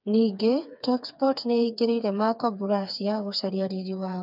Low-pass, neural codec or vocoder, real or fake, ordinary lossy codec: 5.4 kHz; codec, 16 kHz, 4 kbps, FreqCodec, smaller model; fake; none